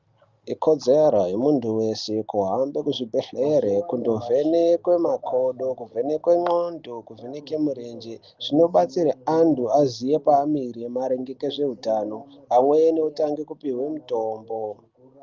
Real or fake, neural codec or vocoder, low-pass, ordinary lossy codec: real; none; 7.2 kHz; Opus, 32 kbps